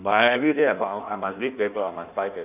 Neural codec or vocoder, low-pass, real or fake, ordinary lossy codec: codec, 16 kHz in and 24 kHz out, 1.1 kbps, FireRedTTS-2 codec; 3.6 kHz; fake; none